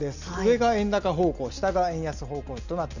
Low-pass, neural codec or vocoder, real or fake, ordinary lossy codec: 7.2 kHz; none; real; none